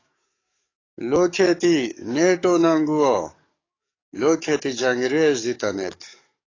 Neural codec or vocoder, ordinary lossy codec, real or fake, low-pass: codec, 44.1 kHz, 7.8 kbps, DAC; AAC, 32 kbps; fake; 7.2 kHz